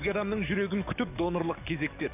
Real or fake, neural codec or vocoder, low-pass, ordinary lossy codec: fake; codec, 44.1 kHz, 7.8 kbps, DAC; 3.6 kHz; none